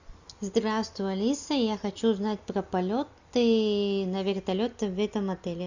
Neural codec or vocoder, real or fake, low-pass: none; real; 7.2 kHz